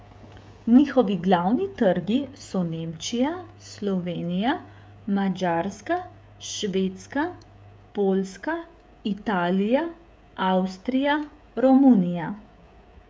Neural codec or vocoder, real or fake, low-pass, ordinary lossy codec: codec, 16 kHz, 16 kbps, FreqCodec, smaller model; fake; none; none